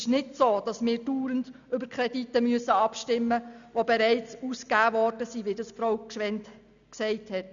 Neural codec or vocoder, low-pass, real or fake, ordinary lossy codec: none; 7.2 kHz; real; none